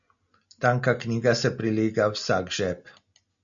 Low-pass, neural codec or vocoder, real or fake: 7.2 kHz; none; real